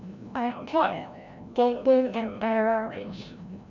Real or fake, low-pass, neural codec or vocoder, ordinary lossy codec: fake; 7.2 kHz; codec, 16 kHz, 0.5 kbps, FreqCodec, larger model; none